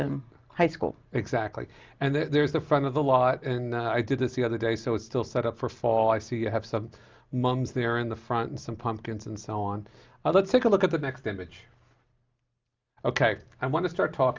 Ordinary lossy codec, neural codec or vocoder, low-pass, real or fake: Opus, 24 kbps; none; 7.2 kHz; real